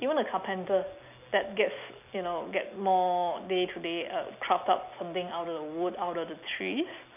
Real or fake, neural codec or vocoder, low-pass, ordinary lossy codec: real; none; 3.6 kHz; none